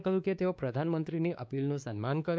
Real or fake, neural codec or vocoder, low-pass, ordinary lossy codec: fake; codec, 16 kHz, 2 kbps, X-Codec, WavLM features, trained on Multilingual LibriSpeech; none; none